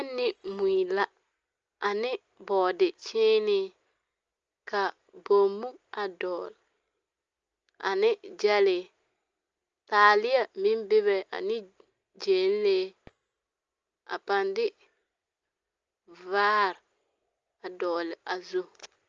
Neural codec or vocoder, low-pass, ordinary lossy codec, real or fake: none; 7.2 kHz; Opus, 24 kbps; real